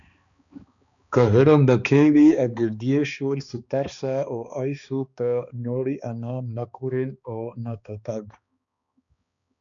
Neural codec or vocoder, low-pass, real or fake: codec, 16 kHz, 2 kbps, X-Codec, HuBERT features, trained on balanced general audio; 7.2 kHz; fake